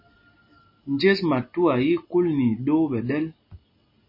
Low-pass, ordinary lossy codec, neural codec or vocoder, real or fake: 5.4 kHz; MP3, 24 kbps; none; real